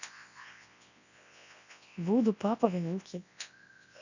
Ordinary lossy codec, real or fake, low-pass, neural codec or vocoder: none; fake; 7.2 kHz; codec, 24 kHz, 0.9 kbps, WavTokenizer, large speech release